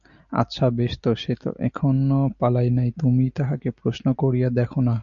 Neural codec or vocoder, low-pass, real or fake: none; 7.2 kHz; real